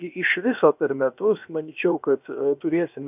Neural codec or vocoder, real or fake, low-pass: codec, 16 kHz, 0.7 kbps, FocalCodec; fake; 3.6 kHz